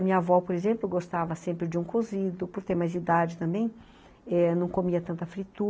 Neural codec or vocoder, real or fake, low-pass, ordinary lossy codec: none; real; none; none